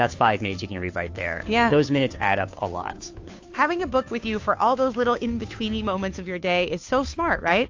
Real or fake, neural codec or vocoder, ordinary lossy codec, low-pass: fake; codec, 16 kHz, 2 kbps, FunCodec, trained on Chinese and English, 25 frames a second; AAC, 48 kbps; 7.2 kHz